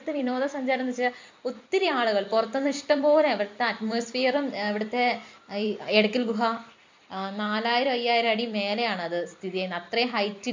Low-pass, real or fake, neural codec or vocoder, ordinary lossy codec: 7.2 kHz; fake; vocoder, 44.1 kHz, 128 mel bands every 256 samples, BigVGAN v2; MP3, 64 kbps